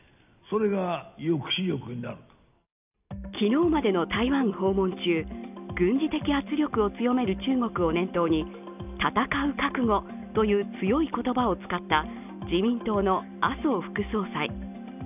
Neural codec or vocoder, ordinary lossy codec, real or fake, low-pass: none; none; real; 3.6 kHz